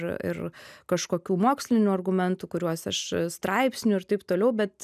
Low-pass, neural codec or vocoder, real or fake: 14.4 kHz; none; real